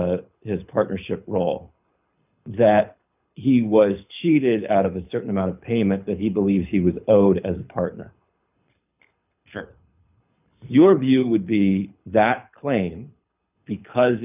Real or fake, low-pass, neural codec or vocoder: fake; 3.6 kHz; codec, 24 kHz, 6 kbps, HILCodec